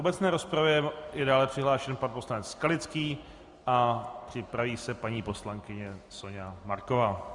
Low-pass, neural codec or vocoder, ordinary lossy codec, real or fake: 10.8 kHz; none; Opus, 64 kbps; real